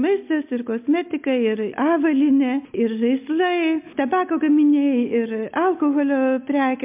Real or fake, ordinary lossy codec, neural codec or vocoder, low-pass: real; MP3, 32 kbps; none; 3.6 kHz